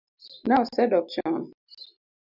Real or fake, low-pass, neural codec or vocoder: real; 5.4 kHz; none